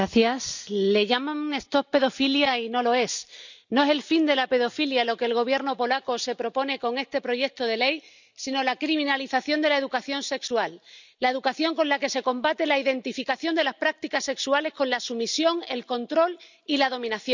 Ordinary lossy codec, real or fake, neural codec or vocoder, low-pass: none; real; none; 7.2 kHz